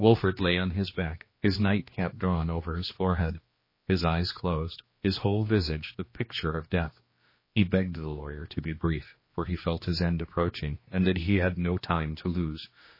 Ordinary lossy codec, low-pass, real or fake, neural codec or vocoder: MP3, 24 kbps; 5.4 kHz; fake; codec, 16 kHz, 2 kbps, X-Codec, HuBERT features, trained on general audio